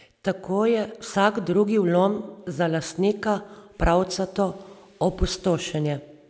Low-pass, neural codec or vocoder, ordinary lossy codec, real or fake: none; none; none; real